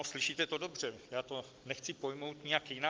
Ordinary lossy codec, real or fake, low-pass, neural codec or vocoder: Opus, 24 kbps; real; 7.2 kHz; none